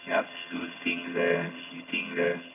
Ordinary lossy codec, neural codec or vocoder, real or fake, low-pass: none; vocoder, 22.05 kHz, 80 mel bands, HiFi-GAN; fake; 3.6 kHz